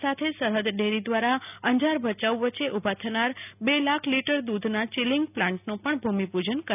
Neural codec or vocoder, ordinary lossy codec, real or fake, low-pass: none; none; real; 3.6 kHz